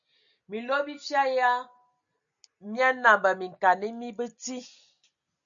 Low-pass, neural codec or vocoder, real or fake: 7.2 kHz; none; real